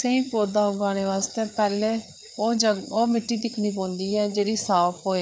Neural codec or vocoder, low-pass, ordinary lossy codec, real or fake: codec, 16 kHz, 4 kbps, FreqCodec, larger model; none; none; fake